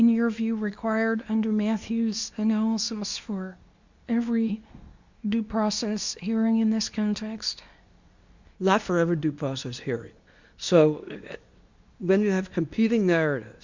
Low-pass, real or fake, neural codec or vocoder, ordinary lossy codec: 7.2 kHz; fake; codec, 24 kHz, 0.9 kbps, WavTokenizer, medium speech release version 2; Opus, 64 kbps